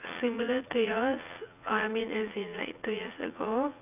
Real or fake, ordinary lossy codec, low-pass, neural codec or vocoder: fake; none; 3.6 kHz; vocoder, 44.1 kHz, 80 mel bands, Vocos